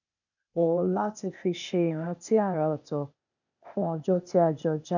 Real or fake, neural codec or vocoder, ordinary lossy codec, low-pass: fake; codec, 16 kHz, 0.8 kbps, ZipCodec; none; 7.2 kHz